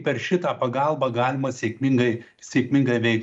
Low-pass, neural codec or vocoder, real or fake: 10.8 kHz; none; real